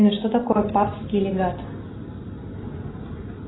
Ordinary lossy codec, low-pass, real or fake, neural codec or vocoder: AAC, 16 kbps; 7.2 kHz; real; none